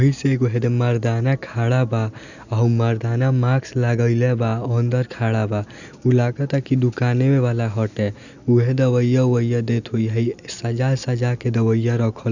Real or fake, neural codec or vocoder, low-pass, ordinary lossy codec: real; none; 7.2 kHz; none